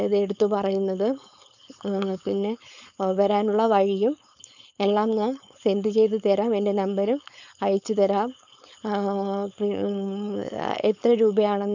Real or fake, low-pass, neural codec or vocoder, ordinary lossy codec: fake; 7.2 kHz; codec, 16 kHz, 4.8 kbps, FACodec; none